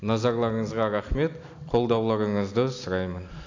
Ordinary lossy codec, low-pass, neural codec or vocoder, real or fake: AAC, 48 kbps; 7.2 kHz; none; real